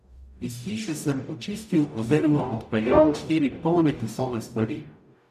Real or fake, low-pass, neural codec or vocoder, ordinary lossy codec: fake; 14.4 kHz; codec, 44.1 kHz, 0.9 kbps, DAC; none